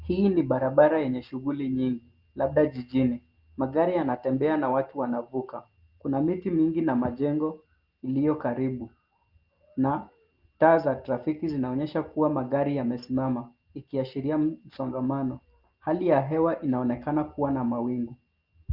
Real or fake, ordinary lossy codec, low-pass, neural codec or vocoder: real; Opus, 32 kbps; 5.4 kHz; none